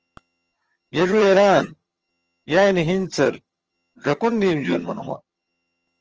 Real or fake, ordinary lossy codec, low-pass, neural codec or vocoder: fake; Opus, 24 kbps; 7.2 kHz; vocoder, 22.05 kHz, 80 mel bands, HiFi-GAN